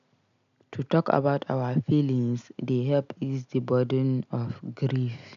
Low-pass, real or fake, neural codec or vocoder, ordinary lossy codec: 7.2 kHz; real; none; none